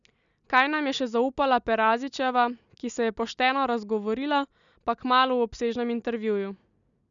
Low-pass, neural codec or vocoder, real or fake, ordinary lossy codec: 7.2 kHz; none; real; none